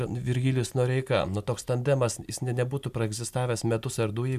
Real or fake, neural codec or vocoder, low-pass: real; none; 14.4 kHz